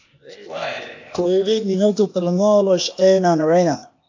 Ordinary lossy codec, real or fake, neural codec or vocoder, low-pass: AAC, 48 kbps; fake; codec, 16 kHz, 0.8 kbps, ZipCodec; 7.2 kHz